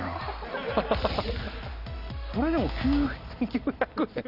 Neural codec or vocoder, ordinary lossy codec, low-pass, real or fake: none; none; 5.4 kHz; real